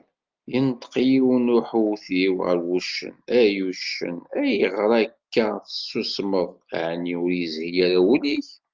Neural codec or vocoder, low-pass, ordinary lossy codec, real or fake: none; 7.2 kHz; Opus, 32 kbps; real